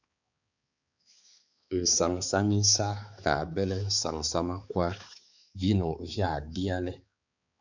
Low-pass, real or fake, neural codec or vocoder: 7.2 kHz; fake; codec, 16 kHz, 4 kbps, X-Codec, HuBERT features, trained on balanced general audio